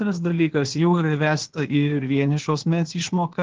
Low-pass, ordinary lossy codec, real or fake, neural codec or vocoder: 7.2 kHz; Opus, 16 kbps; fake; codec, 16 kHz, 0.8 kbps, ZipCodec